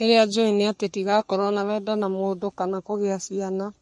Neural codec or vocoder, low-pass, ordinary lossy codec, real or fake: codec, 44.1 kHz, 3.4 kbps, Pupu-Codec; 14.4 kHz; MP3, 48 kbps; fake